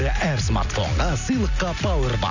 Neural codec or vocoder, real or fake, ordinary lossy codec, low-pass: none; real; none; 7.2 kHz